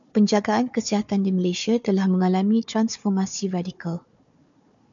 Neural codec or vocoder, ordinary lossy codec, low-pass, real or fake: codec, 16 kHz, 4 kbps, FunCodec, trained on Chinese and English, 50 frames a second; MP3, 96 kbps; 7.2 kHz; fake